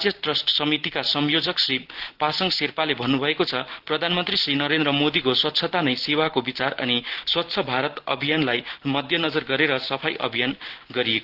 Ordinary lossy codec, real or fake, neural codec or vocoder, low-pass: Opus, 16 kbps; real; none; 5.4 kHz